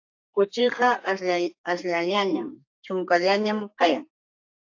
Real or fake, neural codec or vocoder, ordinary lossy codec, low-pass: fake; codec, 32 kHz, 1.9 kbps, SNAC; AAC, 48 kbps; 7.2 kHz